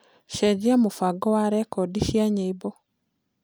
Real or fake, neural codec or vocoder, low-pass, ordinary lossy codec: real; none; none; none